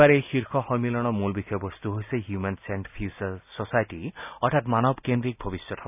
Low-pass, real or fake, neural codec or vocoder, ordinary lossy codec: 3.6 kHz; real; none; none